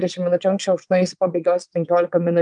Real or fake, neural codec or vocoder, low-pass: fake; vocoder, 22.05 kHz, 80 mel bands, WaveNeXt; 9.9 kHz